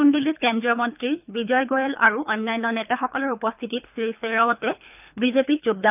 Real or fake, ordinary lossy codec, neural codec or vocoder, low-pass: fake; none; codec, 24 kHz, 3 kbps, HILCodec; 3.6 kHz